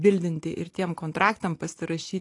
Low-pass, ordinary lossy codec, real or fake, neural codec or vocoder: 10.8 kHz; AAC, 48 kbps; real; none